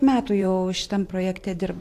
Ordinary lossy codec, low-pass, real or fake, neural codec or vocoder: AAC, 64 kbps; 14.4 kHz; fake; vocoder, 44.1 kHz, 128 mel bands every 512 samples, BigVGAN v2